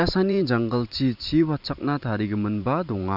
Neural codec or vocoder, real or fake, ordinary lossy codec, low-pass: vocoder, 44.1 kHz, 128 mel bands every 512 samples, BigVGAN v2; fake; none; 5.4 kHz